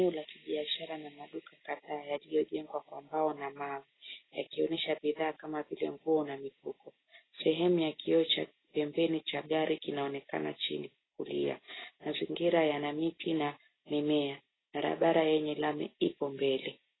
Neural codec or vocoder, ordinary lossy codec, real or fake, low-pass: none; AAC, 16 kbps; real; 7.2 kHz